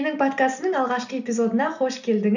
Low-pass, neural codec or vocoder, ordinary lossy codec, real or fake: 7.2 kHz; none; none; real